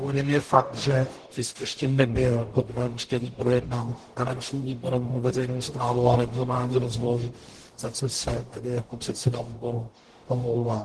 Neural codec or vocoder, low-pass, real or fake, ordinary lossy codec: codec, 44.1 kHz, 0.9 kbps, DAC; 10.8 kHz; fake; Opus, 16 kbps